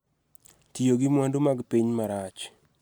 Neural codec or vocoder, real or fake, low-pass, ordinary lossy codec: none; real; none; none